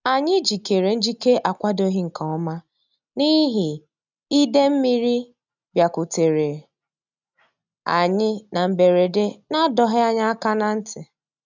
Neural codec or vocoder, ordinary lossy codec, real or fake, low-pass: none; none; real; 7.2 kHz